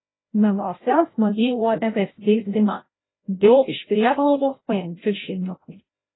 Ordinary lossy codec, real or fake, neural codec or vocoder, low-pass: AAC, 16 kbps; fake; codec, 16 kHz, 0.5 kbps, FreqCodec, larger model; 7.2 kHz